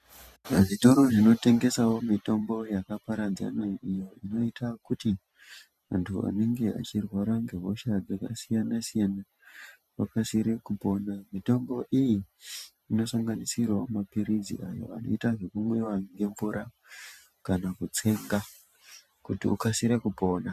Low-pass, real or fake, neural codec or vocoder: 14.4 kHz; fake; vocoder, 44.1 kHz, 128 mel bands every 512 samples, BigVGAN v2